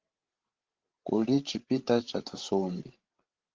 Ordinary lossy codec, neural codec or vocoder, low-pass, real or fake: Opus, 16 kbps; codec, 16 kHz, 16 kbps, FreqCodec, larger model; 7.2 kHz; fake